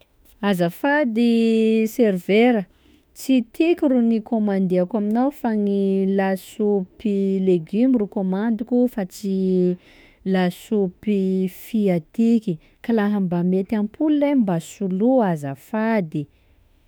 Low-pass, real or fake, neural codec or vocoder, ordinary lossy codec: none; fake; autoencoder, 48 kHz, 32 numbers a frame, DAC-VAE, trained on Japanese speech; none